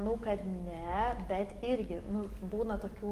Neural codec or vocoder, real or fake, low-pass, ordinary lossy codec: codec, 44.1 kHz, 7.8 kbps, DAC; fake; 14.4 kHz; Opus, 24 kbps